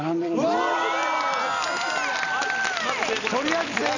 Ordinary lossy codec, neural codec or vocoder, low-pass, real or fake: none; none; 7.2 kHz; real